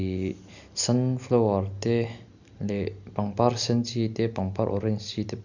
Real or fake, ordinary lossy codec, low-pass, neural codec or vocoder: real; none; 7.2 kHz; none